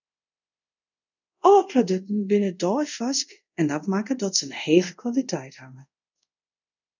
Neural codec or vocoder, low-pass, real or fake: codec, 24 kHz, 0.5 kbps, DualCodec; 7.2 kHz; fake